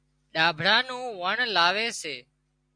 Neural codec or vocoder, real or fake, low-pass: none; real; 9.9 kHz